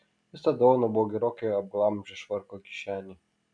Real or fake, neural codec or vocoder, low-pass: real; none; 9.9 kHz